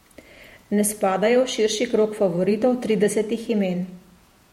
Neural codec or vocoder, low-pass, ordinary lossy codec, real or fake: vocoder, 48 kHz, 128 mel bands, Vocos; 19.8 kHz; MP3, 64 kbps; fake